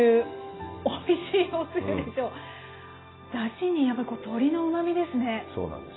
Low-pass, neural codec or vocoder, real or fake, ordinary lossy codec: 7.2 kHz; none; real; AAC, 16 kbps